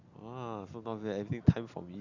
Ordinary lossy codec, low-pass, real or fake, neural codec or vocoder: Opus, 64 kbps; 7.2 kHz; real; none